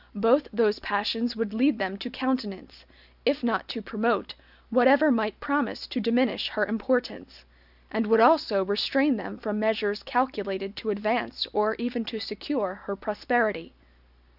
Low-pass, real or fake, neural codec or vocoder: 5.4 kHz; real; none